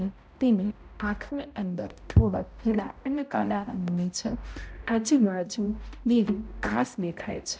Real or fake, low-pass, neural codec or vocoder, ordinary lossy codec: fake; none; codec, 16 kHz, 0.5 kbps, X-Codec, HuBERT features, trained on balanced general audio; none